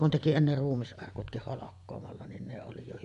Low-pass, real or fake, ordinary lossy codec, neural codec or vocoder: 10.8 kHz; fake; none; vocoder, 24 kHz, 100 mel bands, Vocos